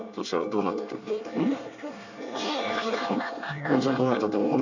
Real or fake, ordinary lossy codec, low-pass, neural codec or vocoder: fake; none; 7.2 kHz; codec, 24 kHz, 1 kbps, SNAC